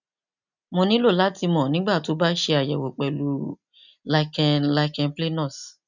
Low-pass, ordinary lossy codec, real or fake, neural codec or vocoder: 7.2 kHz; none; real; none